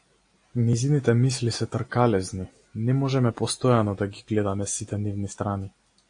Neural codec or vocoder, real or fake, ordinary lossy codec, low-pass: none; real; AAC, 48 kbps; 9.9 kHz